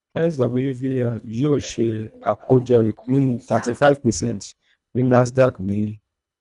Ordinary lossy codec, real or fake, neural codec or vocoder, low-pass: none; fake; codec, 24 kHz, 1.5 kbps, HILCodec; 10.8 kHz